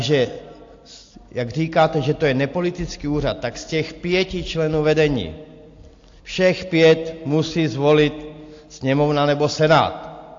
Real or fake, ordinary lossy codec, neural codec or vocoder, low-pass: real; AAC, 48 kbps; none; 7.2 kHz